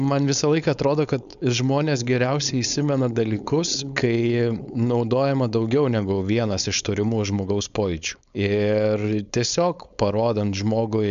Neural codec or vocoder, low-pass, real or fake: codec, 16 kHz, 4.8 kbps, FACodec; 7.2 kHz; fake